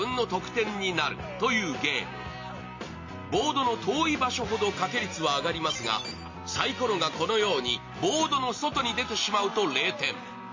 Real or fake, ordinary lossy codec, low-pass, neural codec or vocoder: real; MP3, 32 kbps; 7.2 kHz; none